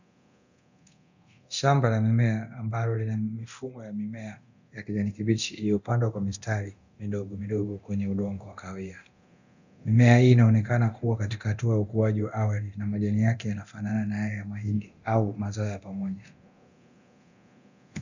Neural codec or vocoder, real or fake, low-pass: codec, 24 kHz, 0.9 kbps, DualCodec; fake; 7.2 kHz